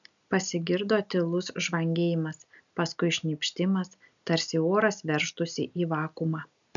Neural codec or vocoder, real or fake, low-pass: none; real; 7.2 kHz